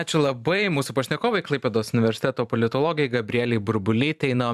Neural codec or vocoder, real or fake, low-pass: vocoder, 44.1 kHz, 128 mel bands every 512 samples, BigVGAN v2; fake; 14.4 kHz